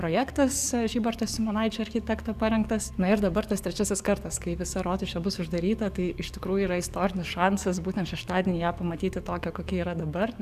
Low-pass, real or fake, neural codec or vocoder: 14.4 kHz; fake; codec, 44.1 kHz, 7.8 kbps, DAC